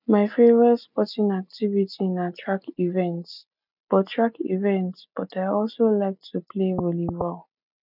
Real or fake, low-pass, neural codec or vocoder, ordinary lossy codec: real; 5.4 kHz; none; none